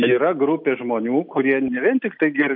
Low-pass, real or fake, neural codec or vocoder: 5.4 kHz; real; none